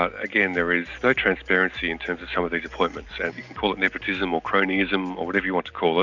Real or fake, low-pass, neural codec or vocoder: real; 7.2 kHz; none